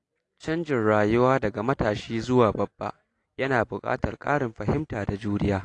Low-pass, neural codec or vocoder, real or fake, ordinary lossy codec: 10.8 kHz; none; real; AAC, 48 kbps